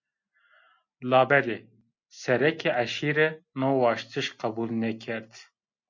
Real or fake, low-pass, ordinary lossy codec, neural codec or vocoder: real; 7.2 kHz; MP3, 48 kbps; none